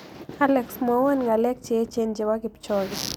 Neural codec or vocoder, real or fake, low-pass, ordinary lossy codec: none; real; none; none